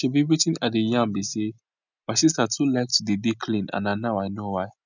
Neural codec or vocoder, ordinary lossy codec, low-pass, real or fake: none; none; 7.2 kHz; real